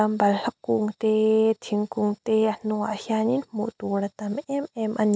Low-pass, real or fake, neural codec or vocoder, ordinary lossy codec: none; real; none; none